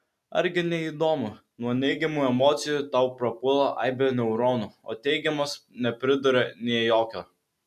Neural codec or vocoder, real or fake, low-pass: none; real; 14.4 kHz